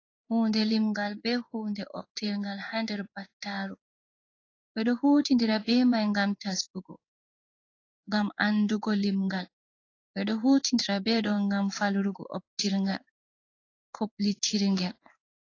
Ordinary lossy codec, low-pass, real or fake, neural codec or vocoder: AAC, 32 kbps; 7.2 kHz; fake; codec, 16 kHz in and 24 kHz out, 1 kbps, XY-Tokenizer